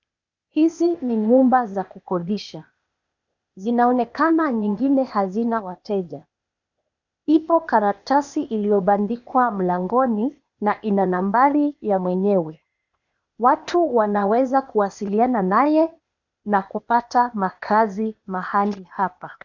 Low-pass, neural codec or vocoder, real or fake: 7.2 kHz; codec, 16 kHz, 0.8 kbps, ZipCodec; fake